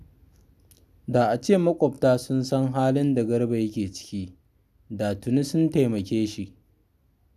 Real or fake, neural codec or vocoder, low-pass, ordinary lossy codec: real; none; 14.4 kHz; none